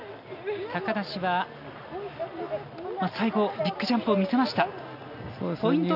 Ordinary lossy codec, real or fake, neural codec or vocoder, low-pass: none; real; none; 5.4 kHz